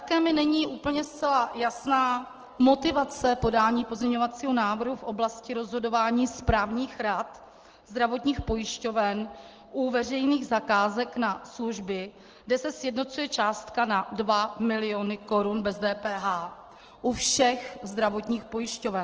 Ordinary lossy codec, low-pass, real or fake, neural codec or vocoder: Opus, 16 kbps; 7.2 kHz; real; none